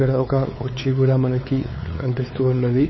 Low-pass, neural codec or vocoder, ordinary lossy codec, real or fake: 7.2 kHz; codec, 16 kHz, 4 kbps, X-Codec, WavLM features, trained on Multilingual LibriSpeech; MP3, 24 kbps; fake